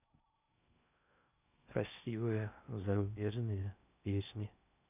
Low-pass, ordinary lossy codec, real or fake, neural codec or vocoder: 3.6 kHz; none; fake; codec, 16 kHz in and 24 kHz out, 0.6 kbps, FocalCodec, streaming, 4096 codes